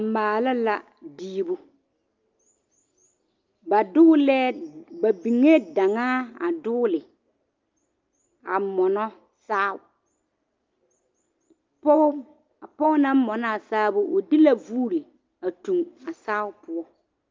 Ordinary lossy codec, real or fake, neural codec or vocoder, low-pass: Opus, 24 kbps; real; none; 7.2 kHz